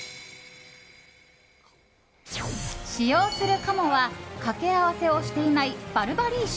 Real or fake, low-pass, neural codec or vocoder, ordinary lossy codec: real; none; none; none